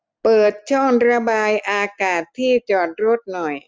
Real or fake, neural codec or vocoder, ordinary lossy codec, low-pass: real; none; none; none